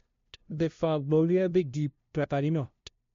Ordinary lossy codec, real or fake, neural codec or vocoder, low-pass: MP3, 48 kbps; fake; codec, 16 kHz, 0.5 kbps, FunCodec, trained on LibriTTS, 25 frames a second; 7.2 kHz